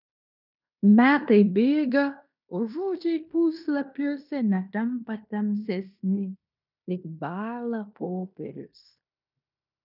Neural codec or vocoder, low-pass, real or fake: codec, 16 kHz in and 24 kHz out, 0.9 kbps, LongCat-Audio-Codec, fine tuned four codebook decoder; 5.4 kHz; fake